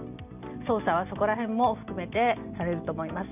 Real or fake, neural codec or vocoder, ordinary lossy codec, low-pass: real; none; none; 3.6 kHz